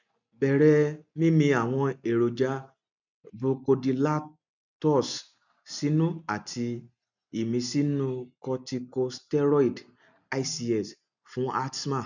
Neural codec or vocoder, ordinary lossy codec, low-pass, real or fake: none; none; 7.2 kHz; real